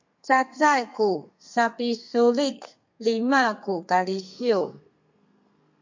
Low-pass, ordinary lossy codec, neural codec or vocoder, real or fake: 7.2 kHz; MP3, 48 kbps; codec, 32 kHz, 1.9 kbps, SNAC; fake